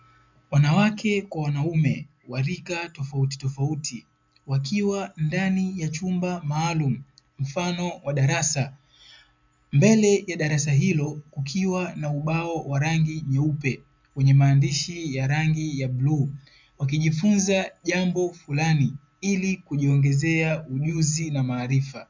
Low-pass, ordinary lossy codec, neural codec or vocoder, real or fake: 7.2 kHz; MP3, 64 kbps; none; real